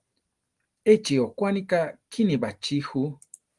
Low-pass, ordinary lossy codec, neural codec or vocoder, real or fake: 10.8 kHz; Opus, 24 kbps; none; real